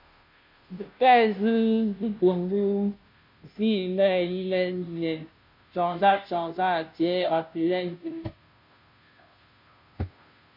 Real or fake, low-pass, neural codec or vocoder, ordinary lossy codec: fake; 5.4 kHz; codec, 16 kHz, 0.5 kbps, FunCodec, trained on Chinese and English, 25 frames a second; MP3, 48 kbps